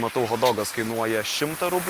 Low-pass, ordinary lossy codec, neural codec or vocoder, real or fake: 14.4 kHz; Opus, 24 kbps; none; real